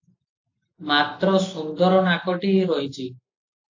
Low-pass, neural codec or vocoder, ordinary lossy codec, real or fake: 7.2 kHz; none; AAC, 48 kbps; real